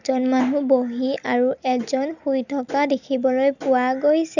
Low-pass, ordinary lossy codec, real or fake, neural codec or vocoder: 7.2 kHz; none; real; none